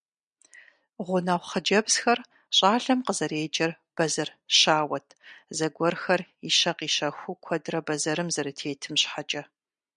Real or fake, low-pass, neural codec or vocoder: real; 9.9 kHz; none